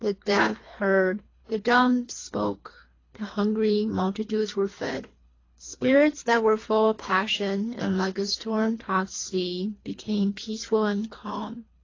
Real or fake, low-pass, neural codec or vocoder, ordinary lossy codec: fake; 7.2 kHz; codec, 24 kHz, 3 kbps, HILCodec; AAC, 32 kbps